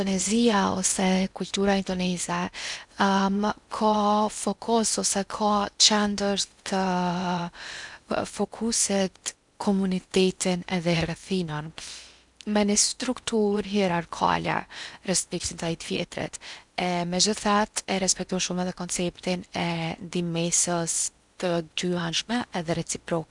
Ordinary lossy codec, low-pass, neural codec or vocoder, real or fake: none; 10.8 kHz; codec, 16 kHz in and 24 kHz out, 0.8 kbps, FocalCodec, streaming, 65536 codes; fake